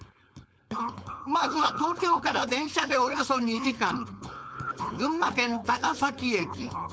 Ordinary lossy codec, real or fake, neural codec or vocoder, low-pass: none; fake; codec, 16 kHz, 4.8 kbps, FACodec; none